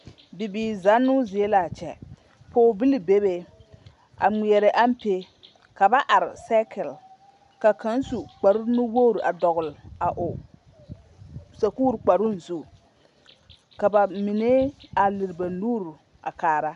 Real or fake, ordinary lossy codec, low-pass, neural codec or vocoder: real; MP3, 96 kbps; 10.8 kHz; none